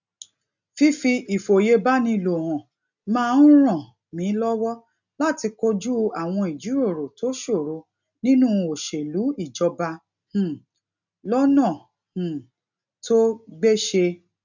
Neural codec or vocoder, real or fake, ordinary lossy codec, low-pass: none; real; none; 7.2 kHz